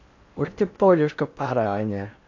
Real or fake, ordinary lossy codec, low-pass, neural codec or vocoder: fake; AAC, 48 kbps; 7.2 kHz; codec, 16 kHz in and 24 kHz out, 0.6 kbps, FocalCodec, streaming, 4096 codes